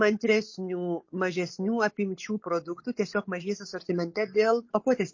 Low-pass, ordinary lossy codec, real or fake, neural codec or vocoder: 7.2 kHz; MP3, 32 kbps; real; none